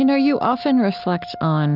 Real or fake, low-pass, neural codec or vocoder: real; 5.4 kHz; none